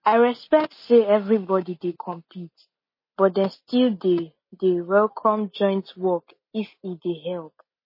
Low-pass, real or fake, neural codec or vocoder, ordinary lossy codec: 5.4 kHz; real; none; MP3, 24 kbps